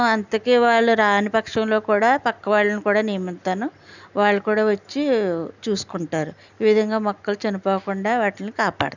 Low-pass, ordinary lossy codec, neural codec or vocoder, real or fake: 7.2 kHz; none; none; real